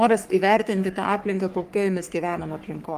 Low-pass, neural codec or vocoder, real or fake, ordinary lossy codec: 14.4 kHz; codec, 44.1 kHz, 3.4 kbps, Pupu-Codec; fake; Opus, 32 kbps